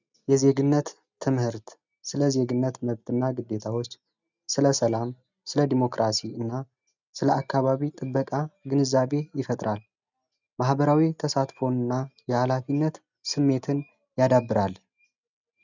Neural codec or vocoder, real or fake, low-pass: none; real; 7.2 kHz